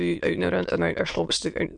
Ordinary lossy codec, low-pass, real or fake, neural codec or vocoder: MP3, 64 kbps; 9.9 kHz; fake; autoencoder, 22.05 kHz, a latent of 192 numbers a frame, VITS, trained on many speakers